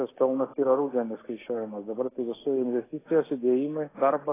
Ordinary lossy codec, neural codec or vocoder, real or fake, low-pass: AAC, 16 kbps; none; real; 3.6 kHz